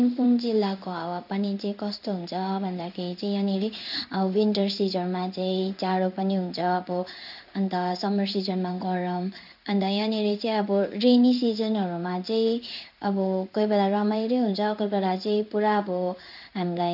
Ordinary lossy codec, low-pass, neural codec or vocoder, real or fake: none; 5.4 kHz; codec, 16 kHz in and 24 kHz out, 1 kbps, XY-Tokenizer; fake